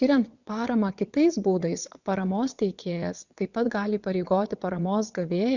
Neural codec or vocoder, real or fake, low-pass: vocoder, 22.05 kHz, 80 mel bands, WaveNeXt; fake; 7.2 kHz